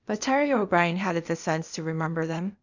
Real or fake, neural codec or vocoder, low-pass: fake; codec, 16 kHz, 0.8 kbps, ZipCodec; 7.2 kHz